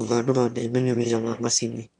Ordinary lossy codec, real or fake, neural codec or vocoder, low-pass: none; fake; autoencoder, 22.05 kHz, a latent of 192 numbers a frame, VITS, trained on one speaker; 9.9 kHz